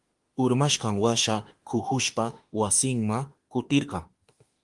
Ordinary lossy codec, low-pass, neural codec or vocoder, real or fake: Opus, 24 kbps; 10.8 kHz; autoencoder, 48 kHz, 32 numbers a frame, DAC-VAE, trained on Japanese speech; fake